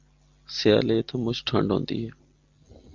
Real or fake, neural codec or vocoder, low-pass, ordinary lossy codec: real; none; 7.2 kHz; Opus, 32 kbps